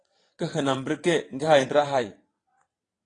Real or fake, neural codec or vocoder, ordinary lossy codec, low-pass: fake; vocoder, 22.05 kHz, 80 mel bands, WaveNeXt; AAC, 32 kbps; 9.9 kHz